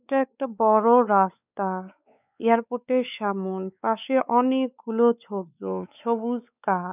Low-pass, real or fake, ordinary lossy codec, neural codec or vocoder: 3.6 kHz; fake; none; codec, 16 kHz, 4 kbps, X-Codec, WavLM features, trained on Multilingual LibriSpeech